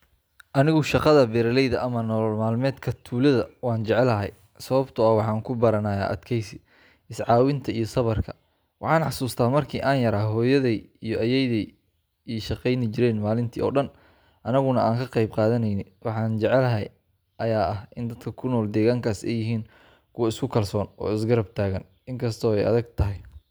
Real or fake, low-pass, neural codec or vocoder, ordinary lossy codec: real; none; none; none